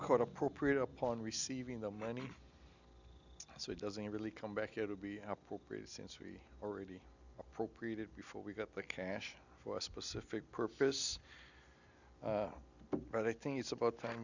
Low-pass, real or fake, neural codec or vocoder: 7.2 kHz; real; none